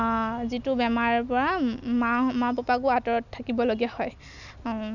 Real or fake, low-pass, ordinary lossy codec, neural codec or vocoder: real; 7.2 kHz; none; none